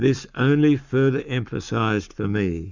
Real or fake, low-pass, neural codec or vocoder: fake; 7.2 kHz; codec, 44.1 kHz, 7.8 kbps, Pupu-Codec